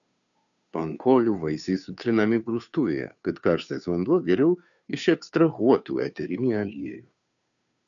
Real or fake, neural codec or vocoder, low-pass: fake; codec, 16 kHz, 2 kbps, FunCodec, trained on Chinese and English, 25 frames a second; 7.2 kHz